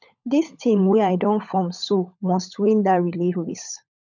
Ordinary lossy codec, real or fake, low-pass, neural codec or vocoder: none; fake; 7.2 kHz; codec, 16 kHz, 16 kbps, FunCodec, trained on LibriTTS, 50 frames a second